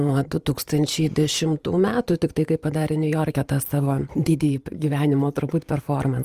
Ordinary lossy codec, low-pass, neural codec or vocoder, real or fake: Opus, 32 kbps; 14.4 kHz; vocoder, 44.1 kHz, 128 mel bands every 512 samples, BigVGAN v2; fake